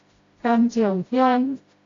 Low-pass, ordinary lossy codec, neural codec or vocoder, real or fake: 7.2 kHz; AAC, 32 kbps; codec, 16 kHz, 0.5 kbps, FreqCodec, smaller model; fake